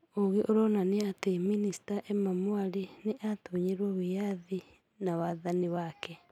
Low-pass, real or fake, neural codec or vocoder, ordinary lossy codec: 19.8 kHz; real; none; none